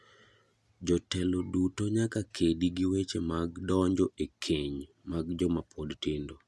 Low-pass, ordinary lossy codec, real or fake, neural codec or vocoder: none; none; real; none